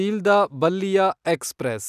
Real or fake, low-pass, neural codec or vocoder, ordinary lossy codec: real; 14.4 kHz; none; none